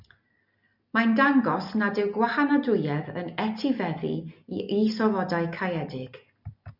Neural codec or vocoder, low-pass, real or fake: none; 5.4 kHz; real